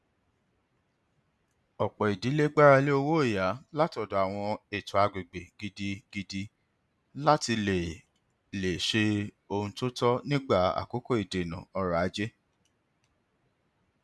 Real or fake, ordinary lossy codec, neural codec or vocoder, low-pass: real; none; none; none